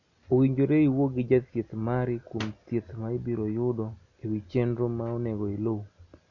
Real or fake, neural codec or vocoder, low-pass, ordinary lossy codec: real; none; 7.2 kHz; none